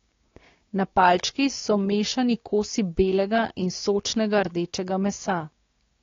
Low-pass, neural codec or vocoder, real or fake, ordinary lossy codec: 7.2 kHz; codec, 16 kHz, 6 kbps, DAC; fake; AAC, 32 kbps